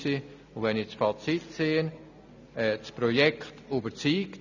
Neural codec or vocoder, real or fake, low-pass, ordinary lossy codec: none; real; 7.2 kHz; none